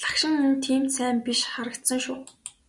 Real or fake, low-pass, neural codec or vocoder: real; 10.8 kHz; none